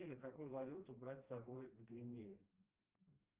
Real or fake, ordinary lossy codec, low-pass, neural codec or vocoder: fake; Opus, 32 kbps; 3.6 kHz; codec, 16 kHz, 1 kbps, FreqCodec, smaller model